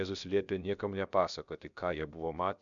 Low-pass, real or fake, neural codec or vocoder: 7.2 kHz; fake; codec, 16 kHz, about 1 kbps, DyCAST, with the encoder's durations